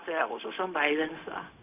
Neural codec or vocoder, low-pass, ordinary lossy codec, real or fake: codec, 16 kHz, 0.4 kbps, LongCat-Audio-Codec; 3.6 kHz; none; fake